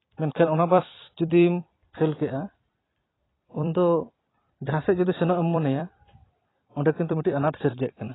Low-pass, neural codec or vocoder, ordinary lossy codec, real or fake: 7.2 kHz; vocoder, 44.1 kHz, 128 mel bands every 256 samples, BigVGAN v2; AAC, 16 kbps; fake